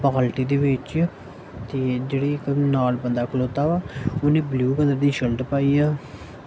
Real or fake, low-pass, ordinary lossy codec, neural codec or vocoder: real; none; none; none